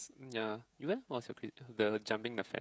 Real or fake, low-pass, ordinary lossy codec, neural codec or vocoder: fake; none; none; codec, 16 kHz, 16 kbps, FreqCodec, smaller model